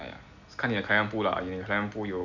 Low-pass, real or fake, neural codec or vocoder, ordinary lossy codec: 7.2 kHz; real; none; none